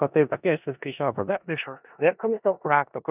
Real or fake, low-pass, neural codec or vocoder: fake; 3.6 kHz; codec, 16 kHz in and 24 kHz out, 0.4 kbps, LongCat-Audio-Codec, four codebook decoder